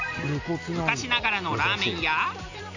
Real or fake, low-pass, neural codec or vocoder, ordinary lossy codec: real; 7.2 kHz; none; none